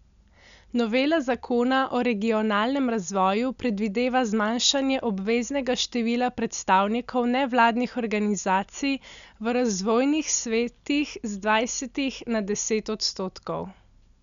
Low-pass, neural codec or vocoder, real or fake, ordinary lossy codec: 7.2 kHz; none; real; none